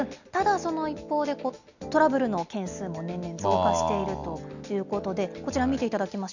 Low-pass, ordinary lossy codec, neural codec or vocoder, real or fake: 7.2 kHz; none; none; real